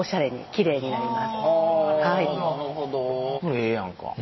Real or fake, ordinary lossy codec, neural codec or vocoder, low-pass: real; MP3, 24 kbps; none; 7.2 kHz